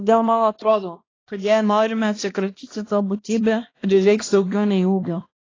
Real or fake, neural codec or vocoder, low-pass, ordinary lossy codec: fake; codec, 16 kHz, 1 kbps, X-Codec, HuBERT features, trained on balanced general audio; 7.2 kHz; AAC, 32 kbps